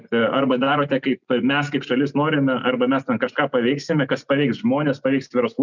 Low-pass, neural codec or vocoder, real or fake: 7.2 kHz; none; real